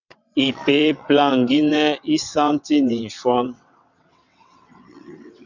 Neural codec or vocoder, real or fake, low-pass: vocoder, 22.05 kHz, 80 mel bands, WaveNeXt; fake; 7.2 kHz